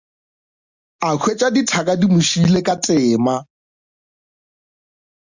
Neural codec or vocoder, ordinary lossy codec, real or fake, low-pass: none; Opus, 64 kbps; real; 7.2 kHz